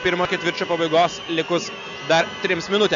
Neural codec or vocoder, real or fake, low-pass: none; real; 7.2 kHz